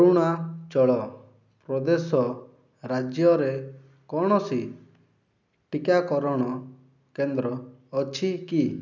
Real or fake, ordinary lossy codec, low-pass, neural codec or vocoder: real; none; 7.2 kHz; none